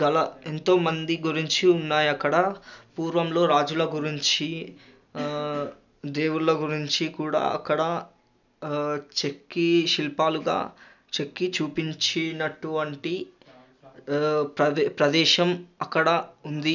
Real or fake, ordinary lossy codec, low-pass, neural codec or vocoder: real; none; 7.2 kHz; none